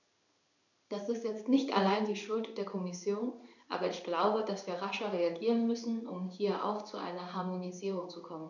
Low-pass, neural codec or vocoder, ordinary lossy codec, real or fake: 7.2 kHz; codec, 16 kHz in and 24 kHz out, 1 kbps, XY-Tokenizer; none; fake